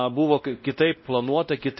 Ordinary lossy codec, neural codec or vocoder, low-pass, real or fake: MP3, 24 kbps; codec, 16 kHz, 1 kbps, X-Codec, WavLM features, trained on Multilingual LibriSpeech; 7.2 kHz; fake